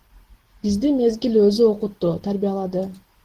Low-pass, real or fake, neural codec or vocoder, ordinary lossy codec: 14.4 kHz; real; none; Opus, 16 kbps